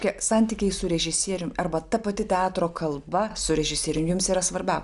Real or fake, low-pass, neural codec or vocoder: real; 10.8 kHz; none